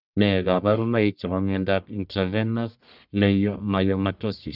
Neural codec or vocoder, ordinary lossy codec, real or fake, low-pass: codec, 44.1 kHz, 1.7 kbps, Pupu-Codec; none; fake; 5.4 kHz